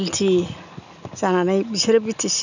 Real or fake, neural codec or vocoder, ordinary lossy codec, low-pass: real; none; none; 7.2 kHz